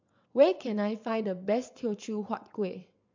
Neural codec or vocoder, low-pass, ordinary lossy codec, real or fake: vocoder, 22.05 kHz, 80 mel bands, WaveNeXt; 7.2 kHz; MP3, 64 kbps; fake